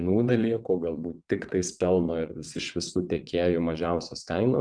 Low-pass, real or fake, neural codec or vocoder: 9.9 kHz; fake; vocoder, 22.05 kHz, 80 mel bands, WaveNeXt